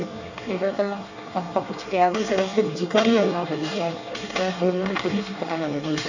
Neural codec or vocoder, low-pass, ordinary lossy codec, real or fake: codec, 24 kHz, 1 kbps, SNAC; 7.2 kHz; none; fake